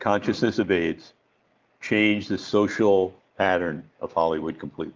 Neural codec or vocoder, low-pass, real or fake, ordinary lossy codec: none; 7.2 kHz; real; Opus, 24 kbps